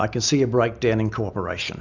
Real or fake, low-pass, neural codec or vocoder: real; 7.2 kHz; none